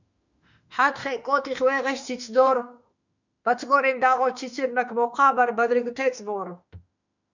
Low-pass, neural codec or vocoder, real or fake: 7.2 kHz; autoencoder, 48 kHz, 32 numbers a frame, DAC-VAE, trained on Japanese speech; fake